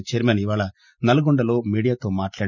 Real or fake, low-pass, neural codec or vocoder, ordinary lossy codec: real; 7.2 kHz; none; none